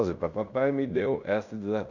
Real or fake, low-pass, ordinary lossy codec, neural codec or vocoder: fake; 7.2 kHz; none; codec, 16 kHz in and 24 kHz out, 0.9 kbps, LongCat-Audio-Codec, fine tuned four codebook decoder